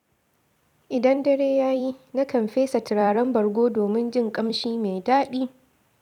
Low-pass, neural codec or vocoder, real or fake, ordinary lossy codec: 19.8 kHz; vocoder, 44.1 kHz, 128 mel bands every 256 samples, BigVGAN v2; fake; none